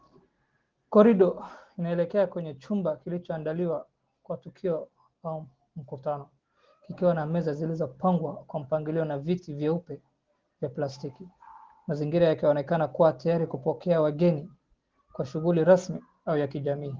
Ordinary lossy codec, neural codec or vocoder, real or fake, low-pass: Opus, 16 kbps; none; real; 7.2 kHz